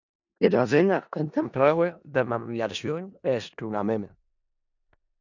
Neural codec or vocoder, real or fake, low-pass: codec, 16 kHz in and 24 kHz out, 0.4 kbps, LongCat-Audio-Codec, four codebook decoder; fake; 7.2 kHz